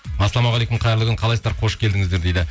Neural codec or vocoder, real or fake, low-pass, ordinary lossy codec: none; real; none; none